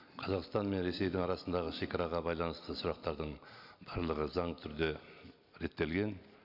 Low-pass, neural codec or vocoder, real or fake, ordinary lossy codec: 5.4 kHz; none; real; none